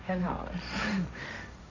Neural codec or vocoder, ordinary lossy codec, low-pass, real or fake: codec, 16 kHz, 1.1 kbps, Voila-Tokenizer; none; 7.2 kHz; fake